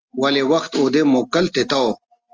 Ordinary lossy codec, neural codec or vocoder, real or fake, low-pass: Opus, 24 kbps; none; real; 7.2 kHz